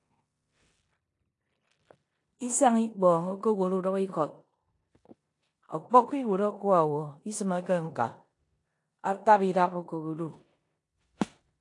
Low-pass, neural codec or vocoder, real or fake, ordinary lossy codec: 10.8 kHz; codec, 16 kHz in and 24 kHz out, 0.9 kbps, LongCat-Audio-Codec, four codebook decoder; fake; AAC, 48 kbps